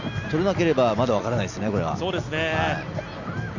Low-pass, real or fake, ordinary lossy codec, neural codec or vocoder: 7.2 kHz; real; none; none